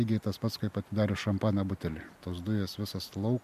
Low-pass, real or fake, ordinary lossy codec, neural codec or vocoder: 14.4 kHz; real; MP3, 96 kbps; none